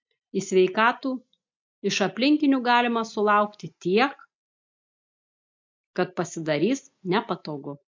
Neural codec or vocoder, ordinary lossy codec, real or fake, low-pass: none; MP3, 64 kbps; real; 7.2 kHz